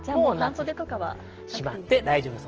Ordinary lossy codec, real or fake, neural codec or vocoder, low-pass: Opus, 16 kbps; real; none; 7.2 kHz